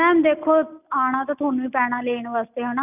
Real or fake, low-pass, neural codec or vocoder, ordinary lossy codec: real; 3.6 kHz; none; none